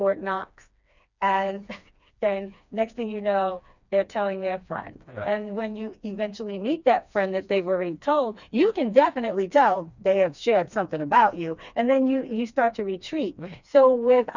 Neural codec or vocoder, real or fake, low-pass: codec, 16 kHz, 2 kbps, FreqCodec, smaller model; fake; 7.2 kHz